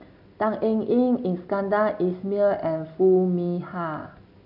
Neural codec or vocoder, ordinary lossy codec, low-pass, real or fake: none; none; 5.4 kHz; real